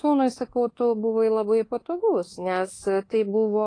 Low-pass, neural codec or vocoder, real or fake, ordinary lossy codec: 9.9 kHz; codec, 24 kHz, 1.2 kbps, DualCodec; fake; AAC, 32 kbps